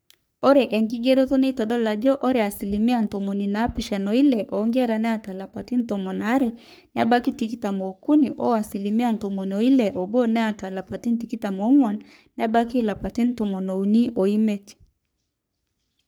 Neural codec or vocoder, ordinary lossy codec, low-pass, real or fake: codec, 44.1 kHz, 3.4 kbps, Pupu-Codec; none; none; fake